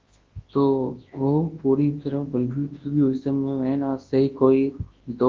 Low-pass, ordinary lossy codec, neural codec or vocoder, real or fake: 7.2 kHz; Opus, 16 kbps; codec, 24 kHz, 0.9 kbps, WavTokenizer, large speech release; fake